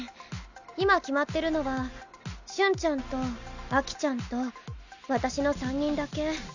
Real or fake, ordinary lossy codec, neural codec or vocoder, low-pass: real; none; none; 7.2 kHz